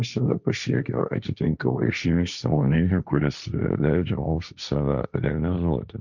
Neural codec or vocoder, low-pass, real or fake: codec, 16 kHz, 1.1 kbps, Voila-Tokenizer; 7.2 kHz; fake